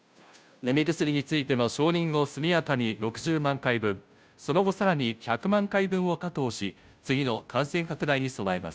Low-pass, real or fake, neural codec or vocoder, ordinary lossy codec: none; fake; codec, 16 kHz, 0.5 kbps, FunCodec, trained on Chinese and English, 25 frames a second; none